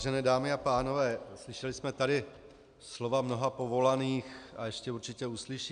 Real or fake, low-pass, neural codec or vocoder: real; 9.9 kHz; none